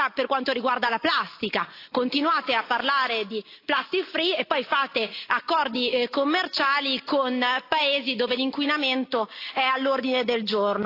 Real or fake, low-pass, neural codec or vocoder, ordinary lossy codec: real; 5.4 kHz; none; AAC, 32 kbps